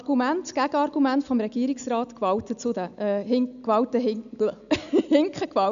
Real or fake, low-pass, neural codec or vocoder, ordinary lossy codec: real; 7.2 kHz; none; none